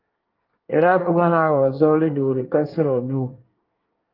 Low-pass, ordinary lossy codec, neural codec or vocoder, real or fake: 5.4 kHz; Opus, 32 kbps; codec, 24 kHz, 1 kbps, SNAC; fake